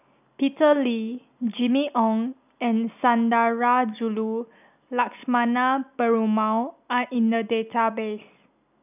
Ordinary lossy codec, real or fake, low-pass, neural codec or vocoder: none; real; 3.6 kHz; none